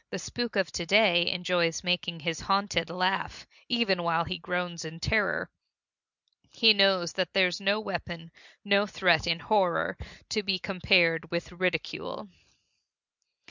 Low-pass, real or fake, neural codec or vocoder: 7.2 kHz; real; none